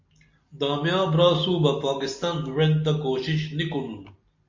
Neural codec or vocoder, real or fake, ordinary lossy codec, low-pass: none; real; MP3, 48 kbps; 7.2 kHz